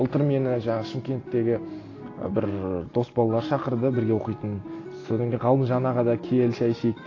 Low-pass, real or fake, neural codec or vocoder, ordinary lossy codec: 7.2 kHz; real; none; AAC, 32 kbps